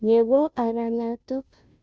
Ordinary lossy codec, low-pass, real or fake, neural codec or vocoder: none; none; fake; codec, 16 kHz, 0.5 kbps, FunCodec, trained on Chinese and English, 25 frames a second